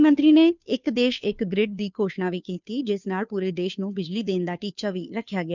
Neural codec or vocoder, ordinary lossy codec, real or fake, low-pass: codec, 16 kHz, 2 kbps, FunCodec, trained on Chinese and English, 25 frames a second; none; fake; 7.2 kHz